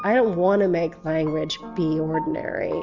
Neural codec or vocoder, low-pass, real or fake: none; 7.2 kHz; real